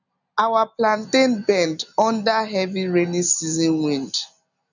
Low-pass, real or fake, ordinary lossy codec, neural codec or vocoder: 7.2 kHz; real; none; none